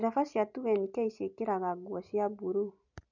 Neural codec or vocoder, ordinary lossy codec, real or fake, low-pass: none; none; real; 7.2 kHz